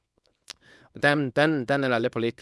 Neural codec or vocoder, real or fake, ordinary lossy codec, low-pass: codec, 24 kHz, 0.9 kbps, WavTokenizer, small release; fake; none; none